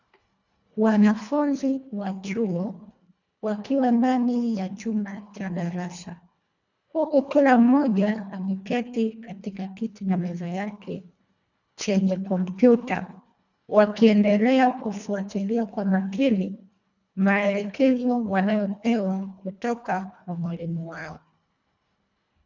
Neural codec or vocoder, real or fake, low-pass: codec, 24 kHz, 1.5 kbps, HILCodec; fake; 7.2 kHz